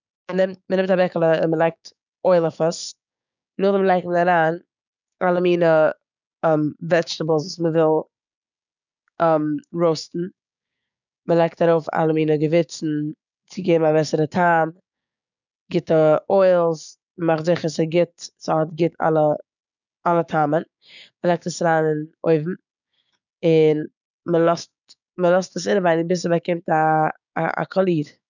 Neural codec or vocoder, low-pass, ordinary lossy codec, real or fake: codec, 16 kHz, 6 kbps, DAC; 7.2 kHz; none; fake